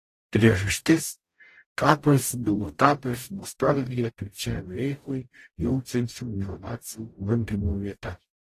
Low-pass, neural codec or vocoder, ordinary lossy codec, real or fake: 14.4 kHz; codec, 44.1 kHz, 0.9 kbps, DAC; AAC, 48 kbps; fake